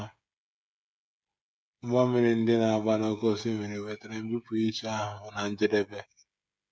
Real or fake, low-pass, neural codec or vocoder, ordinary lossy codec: fake; none; codec, 16 kHz, 16 kbps, FreqCodec, smaller model; none